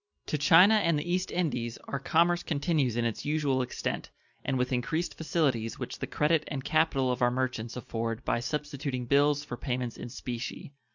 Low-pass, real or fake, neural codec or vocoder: 7.2 kHz; real; none